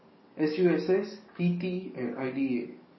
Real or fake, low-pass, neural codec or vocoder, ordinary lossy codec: fake; 7.2 kHz; codec, 44.1 kHz, 7.8 kbps, DAC; MP3, 24 kbps